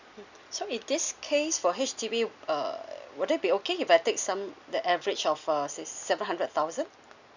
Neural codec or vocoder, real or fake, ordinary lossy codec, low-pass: none; real; none; 7.2 kHz